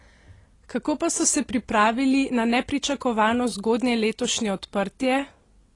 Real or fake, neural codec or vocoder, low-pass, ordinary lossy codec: real; none; 10.8 kHz; AAC, 32 kbps